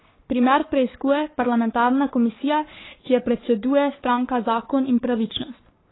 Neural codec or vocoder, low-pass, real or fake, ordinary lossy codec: codec, 44.1 kHz, 3.4 kbps, Pupu-Codec; 7.2 kHz; fake; AAC, 16 kbps